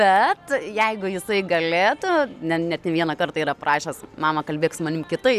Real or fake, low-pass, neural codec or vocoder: real; 14.4 kHz; none